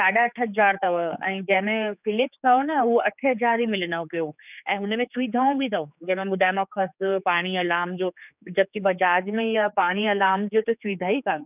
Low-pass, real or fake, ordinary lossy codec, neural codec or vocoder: 3.6 kHz; fake; none; codec, 16 kHz, 4 kbps, X-Codec, HuBERT features, trained on general audio